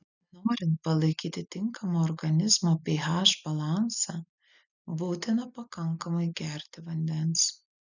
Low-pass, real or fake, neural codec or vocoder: 7.2 kHz; real; none